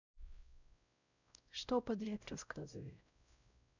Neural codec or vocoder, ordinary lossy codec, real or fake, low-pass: codec, 16 kHz, 0.5 kbps, X-Codec, WavLM features, trained on Multilingual LibriSpeech; none; fake; 7.2 kHz